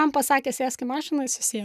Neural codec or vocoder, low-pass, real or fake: none; 14.4 kHz; real